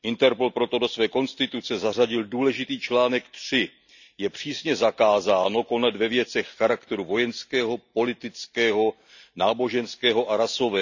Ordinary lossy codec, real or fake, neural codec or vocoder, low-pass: none; real; none; 7.2 kHz